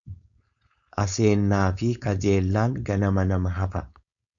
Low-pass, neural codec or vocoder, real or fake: 7.2 kHz; codec, 16 kHz, 4.8 kbps, FACodec; fake